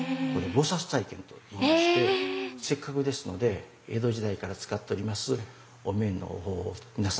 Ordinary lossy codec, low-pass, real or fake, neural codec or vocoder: none; none; real; none